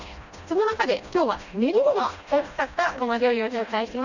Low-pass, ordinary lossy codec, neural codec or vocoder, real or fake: 7.2 kHz; none; codec, 16 kHz, 1 kbps, FreqCodec, smaller model; fake